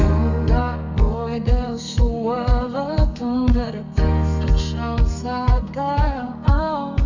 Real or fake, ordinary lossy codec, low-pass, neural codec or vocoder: fake; none; 7.2 kHz; codec, 32 kHz, 1.9 kbps, SNAC